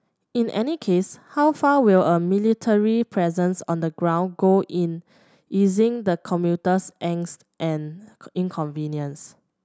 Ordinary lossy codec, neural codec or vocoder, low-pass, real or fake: none; none; none; real